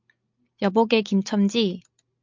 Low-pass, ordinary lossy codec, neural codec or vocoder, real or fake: 7.2 kHz; MP3, 64 kbps; none; real